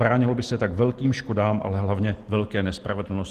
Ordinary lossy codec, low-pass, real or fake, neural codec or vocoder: Opus, 16 kbps; 14.4 kHz; real; none